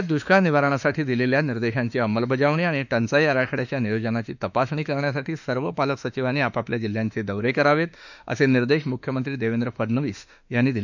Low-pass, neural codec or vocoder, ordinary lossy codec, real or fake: 7.2 kHz; autoencoder, 48 kHz, 32 numbers a frame, DAC-VAE, trained on Japanese speech; none; fake